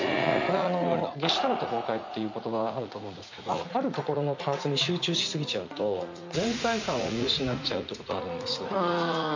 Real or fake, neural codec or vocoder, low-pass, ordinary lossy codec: fake; vocoder, 44.1 kHz, 80 mel bands, Vocos; 7.2 kHz; MP3, 64 kbps